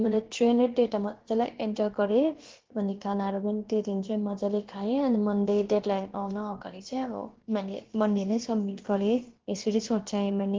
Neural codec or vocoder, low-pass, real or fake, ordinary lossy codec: codec, 16 kHz, about 1 kbps, DyCAST, with the encoder's durations; 7.2 kHz; fake; Opus, 16 kbps